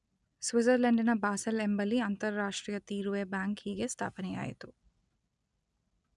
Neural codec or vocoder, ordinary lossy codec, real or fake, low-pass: none; none; real; 10.8 kHz